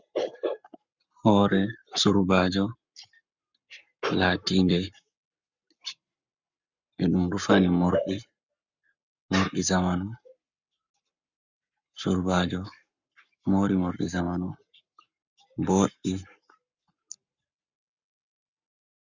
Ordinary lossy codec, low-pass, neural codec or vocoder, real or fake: Opus, 64 kbps; 7.2 kHz; codec, 44.1 kHz, 7.8 kbps, Pupu-Codec; fake